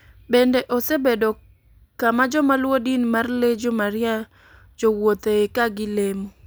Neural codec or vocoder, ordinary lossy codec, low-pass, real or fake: none; none; none; real